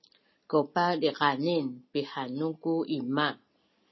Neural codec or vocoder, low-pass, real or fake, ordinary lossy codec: none; 7.2 kHz; real; MP3, 24 kbps